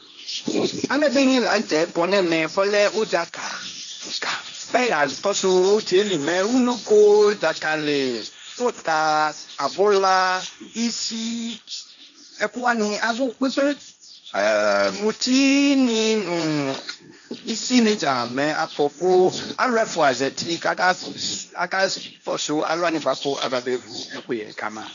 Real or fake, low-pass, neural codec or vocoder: fake; 7.2 kHz; codec, 16 kHz, 1.1 kbps, Voila-Tokenizer